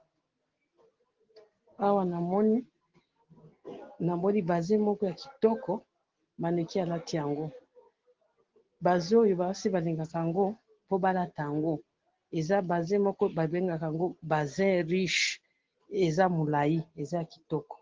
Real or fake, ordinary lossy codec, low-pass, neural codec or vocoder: real; Opus, 16 kbps; 7.2 kHz; none